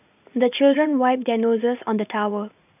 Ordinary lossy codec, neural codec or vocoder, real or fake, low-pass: none; vocoder, 44.1 kHz, 80 mel bands, Vocos; fake; 3.6 kHz